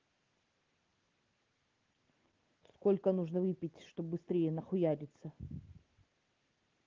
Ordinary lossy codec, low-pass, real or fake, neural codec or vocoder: Opus, 16 kbps; 7.2 kHz; real; none